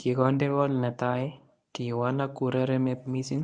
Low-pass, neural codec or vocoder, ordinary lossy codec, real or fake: 9.9 kHz; codec, 24 kHz, 0.9 kbps, WavTokenizer, medium speech release version 1; none; fake